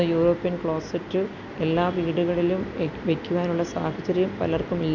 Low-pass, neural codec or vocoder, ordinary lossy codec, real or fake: 7.2 kHz; none; none; real